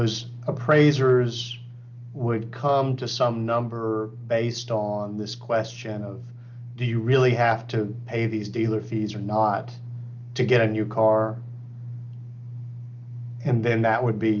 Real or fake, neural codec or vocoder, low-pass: real; none; 7.2 kHz